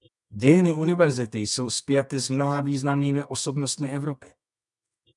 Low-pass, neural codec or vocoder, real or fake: 10.8 kHz; codec, 24 kHz, 0.9 kbps, WavTokenizer, medium music audio release; fake